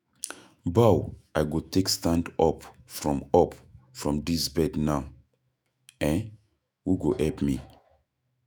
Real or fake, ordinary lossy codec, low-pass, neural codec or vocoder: fake; none; none; autoencoder, 48 kHz, 128 numbers a frame, DAC-VAE, trained on Japanese speech